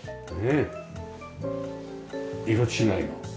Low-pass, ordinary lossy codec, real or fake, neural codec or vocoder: none; none; real; none